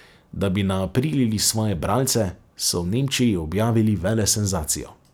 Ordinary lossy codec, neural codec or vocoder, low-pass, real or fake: none; none; none; real